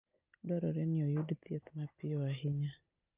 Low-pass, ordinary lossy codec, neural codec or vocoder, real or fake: 3.6 kHz; Opus, 24 kbps; none; real